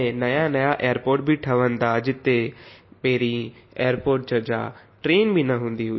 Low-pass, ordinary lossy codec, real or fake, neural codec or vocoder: 7.2 kHz; MP3, 24 kbps; real; none